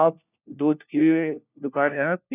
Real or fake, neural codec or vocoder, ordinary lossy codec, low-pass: fake; codec, 16 kHz, 1 kbps, FunCodec, trained on LibriTTS, 50 frames a second; none; 3.6 kHz